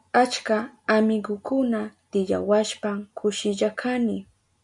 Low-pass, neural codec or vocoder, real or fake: 10.8 kHz; none; real